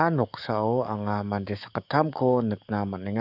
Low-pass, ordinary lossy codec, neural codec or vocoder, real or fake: 5.4 kHz; none; codec, 44.1 kHz, 7.8 kbps, DAC; fake